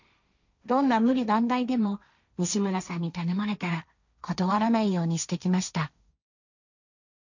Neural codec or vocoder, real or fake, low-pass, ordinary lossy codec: codec, 16 kHz, 1.1 kbps, Voila-Tokenizer; fake; 7.2 kHz; none